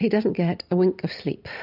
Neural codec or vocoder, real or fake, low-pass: none; real; 5.4 kHz